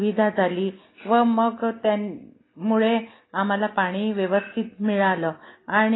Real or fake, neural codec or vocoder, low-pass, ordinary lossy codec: real; none; 7.2 kHz; AAC, 16 kbps